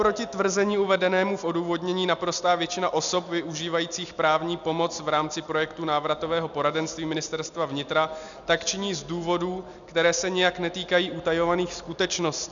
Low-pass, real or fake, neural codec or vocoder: 7.2 kHz; real; none